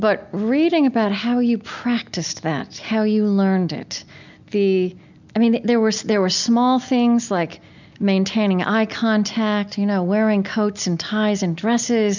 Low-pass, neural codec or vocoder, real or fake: 7.2 kHz; none; real